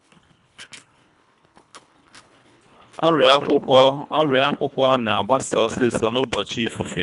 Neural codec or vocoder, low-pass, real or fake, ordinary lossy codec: codec, 24 kHz, 1.5 kbps, HILCodec; 10.8 kHz; fake; none